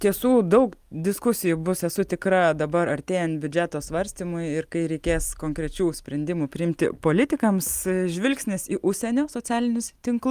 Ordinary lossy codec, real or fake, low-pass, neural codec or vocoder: Opus, 32 kbps; real; 14.4 kHz; none